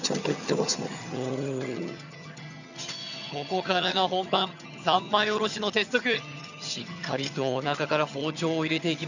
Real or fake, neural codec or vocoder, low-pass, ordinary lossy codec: fake; vocoder, 22.05 kHz, 80 mel bands, HiFi-GAN; 7.2 kHz; none